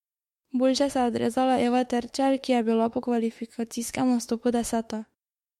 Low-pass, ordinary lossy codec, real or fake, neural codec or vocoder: 19.8 kHz; MP3, 64 kbps; fake; autoencoder, 48 kHz, 32 numbers a frame, DAC-VAE, trained on Japanese speech